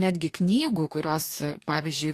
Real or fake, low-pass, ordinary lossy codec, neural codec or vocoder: fake; 14.4 kHz; AAC, 64 kbps; codec, 44.1 kHz, 2.6 kbps, DAC